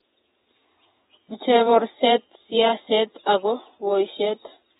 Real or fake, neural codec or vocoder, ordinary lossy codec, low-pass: fake; vocoder, 48 kHz, 128 mel bands, Vocos; AAC, 16 kbps; 19.8 kHz